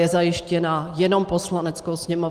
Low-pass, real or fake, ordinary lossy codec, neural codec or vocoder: 14.4 kHz; real; Opus, 24 kbps; none